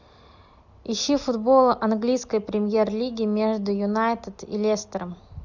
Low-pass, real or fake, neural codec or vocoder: 7.2 kHz; real; none